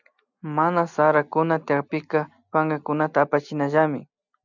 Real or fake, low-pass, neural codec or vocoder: real; 7.2 kHz; none